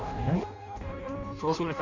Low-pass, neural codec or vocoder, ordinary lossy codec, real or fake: 7.2 kHz; codec, 16 kHz in and 24 kHz out, 0.6 kbps, FireRedTTS-2 codec; none; fake